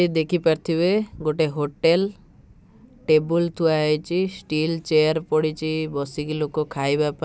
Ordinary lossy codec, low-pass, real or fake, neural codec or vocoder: none; none; real; none